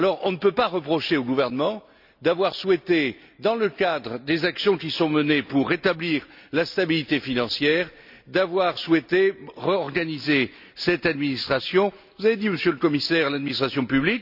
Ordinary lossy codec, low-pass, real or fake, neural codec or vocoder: none; 5.4 kHz; real; none